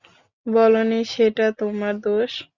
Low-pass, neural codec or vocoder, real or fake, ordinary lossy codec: 7.2 kHz; none; real; Opus, 64 kbps